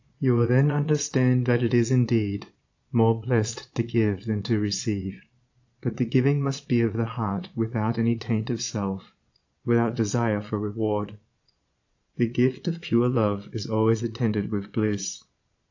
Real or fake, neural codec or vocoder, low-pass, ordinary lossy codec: fake; vocoder, 22.05 kHz, 80 mel bands, Vocos; 7.2 kHz; AAC, 48 kbps